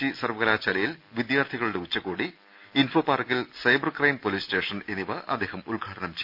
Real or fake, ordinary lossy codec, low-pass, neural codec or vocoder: real; Opus, 64 kbps; 5.4 kHz; none